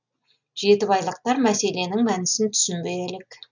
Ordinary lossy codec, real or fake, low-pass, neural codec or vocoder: none; real; 7.2 kHz; none